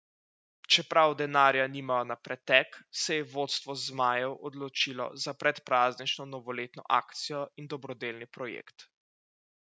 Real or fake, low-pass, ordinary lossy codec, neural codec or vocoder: real; none; none; none